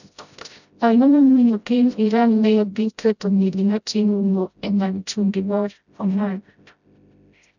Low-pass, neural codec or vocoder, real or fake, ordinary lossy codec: 7.2 kHz; codec, 16 kHz, 0.5 kbps, FreqCodec, smaller model; fake; none